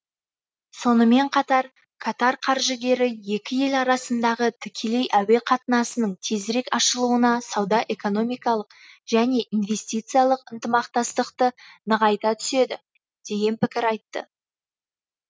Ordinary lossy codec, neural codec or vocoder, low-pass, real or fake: none; none; none; real